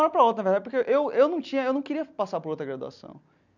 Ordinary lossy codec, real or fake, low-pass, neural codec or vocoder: none; real; 7.2 kHz; none